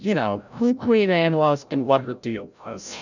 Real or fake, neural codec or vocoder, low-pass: fake; codec, 16 kHz, 0.5 kbps, FreqCodec, larger model; 7.2 kHz